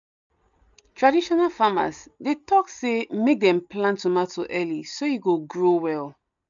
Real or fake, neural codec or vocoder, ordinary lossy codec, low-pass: real; none; none; 7.2 kHz